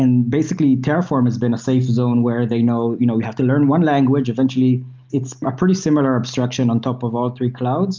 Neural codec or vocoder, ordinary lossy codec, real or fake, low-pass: none; Opus, 24 kbps; real; 7.2 kHz